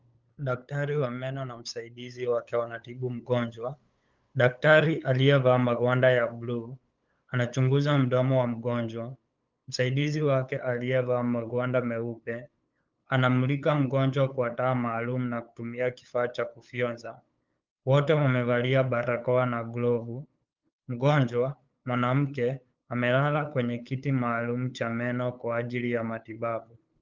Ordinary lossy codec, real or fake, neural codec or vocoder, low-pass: Opus, 24 kbps; fake; codec, 16 kHz, 8 kbps, FunCodec, trained on LibriTTS, 25 frames a second; 7.2 kHz